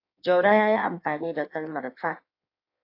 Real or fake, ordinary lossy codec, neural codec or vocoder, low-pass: fake; AAC, 32 kbps; codec, 16 kHz in and 24 kHz out, 1.1 kbps, FireRedTTS-2 codec; 5.4 kHz